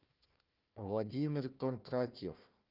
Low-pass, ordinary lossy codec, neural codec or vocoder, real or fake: 5.4 kHz; Opus, 24 kbps; codec, 16 kHz, 1 kbps, FunCodec, trained on Chinese and English, 50 frames a second; fake